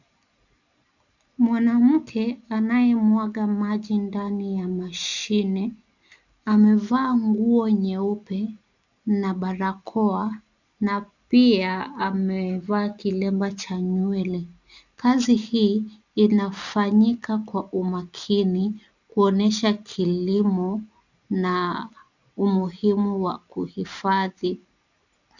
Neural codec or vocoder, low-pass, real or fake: none; 7.2 kHz; real